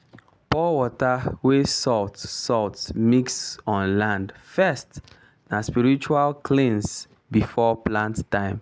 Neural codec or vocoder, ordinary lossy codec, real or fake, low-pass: none; none; real; none